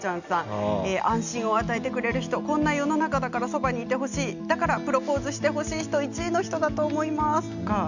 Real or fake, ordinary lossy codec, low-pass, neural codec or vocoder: real; none; 7.2 kHz; none